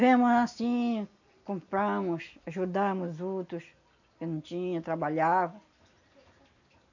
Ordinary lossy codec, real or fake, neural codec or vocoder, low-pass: none; real; none; 7.2 kHz